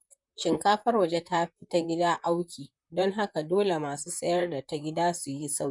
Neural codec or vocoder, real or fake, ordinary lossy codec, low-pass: vocoder, 44.1 kHz, 128 mel bands, Pupu-Vocoder; fake; none; 10.8 kHz